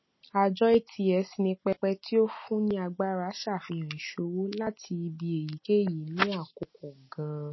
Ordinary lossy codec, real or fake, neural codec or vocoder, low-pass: MP3, 24 kbps; real; none; 7.2 kHz